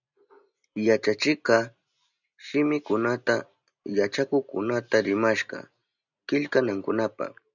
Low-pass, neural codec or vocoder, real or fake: 7.2 kHz; none; real